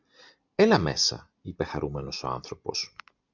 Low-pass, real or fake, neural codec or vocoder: 7.2 kHz; real; none